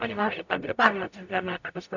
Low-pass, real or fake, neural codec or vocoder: 7.2 kHz; fake; codec, 44.1 kHz, 0.9 kbps, DAC